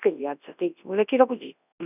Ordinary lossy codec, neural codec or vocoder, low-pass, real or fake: none; codec, 24 kHz, 0.9 kbps, WavTokenizer, large speech release; 3.6 kHz; fake